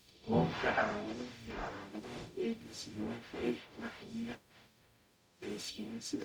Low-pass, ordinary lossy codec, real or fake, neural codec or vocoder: none; none; fake; codec, 44.1 kHz, 0.9 kbps, DAC